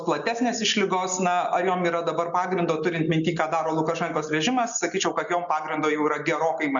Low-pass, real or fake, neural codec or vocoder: 7.2 kHz; real; none